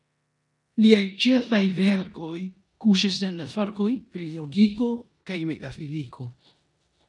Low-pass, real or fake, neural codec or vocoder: 10.8 kHz; fake; codec, 16 kHz in and 24 kHz out, 0.9 kbps, LongCat-Audio-Codec, four codebook decoder